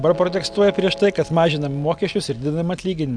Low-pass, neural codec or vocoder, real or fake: 9.9 kHz; none; real